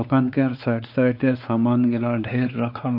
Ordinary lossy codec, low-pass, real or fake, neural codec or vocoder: none; 5.4 kHz; fake; codec, 16 kHz, 4 kbps, X-Codec, WavLM features, trained on Multilingual LibriSpeech